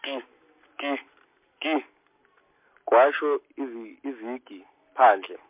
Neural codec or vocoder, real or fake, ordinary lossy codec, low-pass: none; real; MP3, 32 kbps; 3.6 kHz